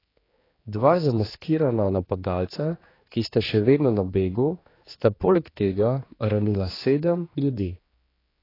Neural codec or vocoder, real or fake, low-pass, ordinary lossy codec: codec, 16 kHz, 2 kbps, X-Codec, HuBERT features, trained on general audio; fake; 5.4 kHz; AAC, 24 kbps